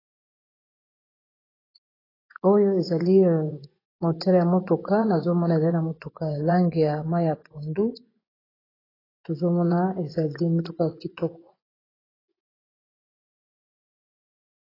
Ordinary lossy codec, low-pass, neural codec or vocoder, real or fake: AAC, 24 kbps; 5.4 kHz; vocoder, 24 kHz, 100 mel bands, Vocos; fake